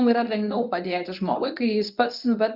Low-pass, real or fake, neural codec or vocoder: 5.4 kHz; fake; codec, 24 kHz, 0.9 kbps, WavTokenizer, medium speech release version 1